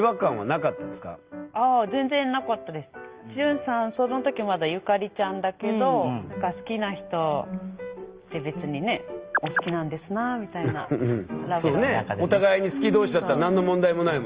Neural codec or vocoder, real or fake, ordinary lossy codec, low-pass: none; real; Opus, 24 kbps; 3.6 kHz